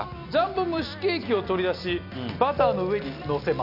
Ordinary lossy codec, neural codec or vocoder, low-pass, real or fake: none; none; 5.4 kHz; real